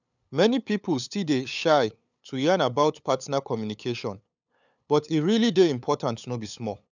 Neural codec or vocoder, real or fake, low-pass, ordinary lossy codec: codec, 16 kHz, 8 kbps, FunCodec, trained on LibriTTS, 25 frames a second; fake; 7.2 kHz; none